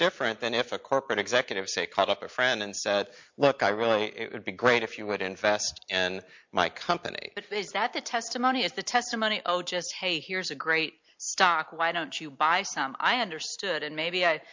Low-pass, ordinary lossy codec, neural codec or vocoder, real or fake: 7.2 kHz; MP3, 48 kbps; none; real